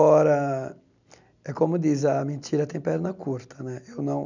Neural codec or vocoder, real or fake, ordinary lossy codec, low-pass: none; real; none; 7.2 kHz